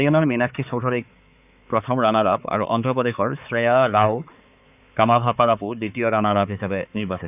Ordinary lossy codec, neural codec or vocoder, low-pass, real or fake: none; codec, 16 kHz, 4 kbps, X-Codec, HuBERT features, trained on balanced general audio; 3.6 kHz; fake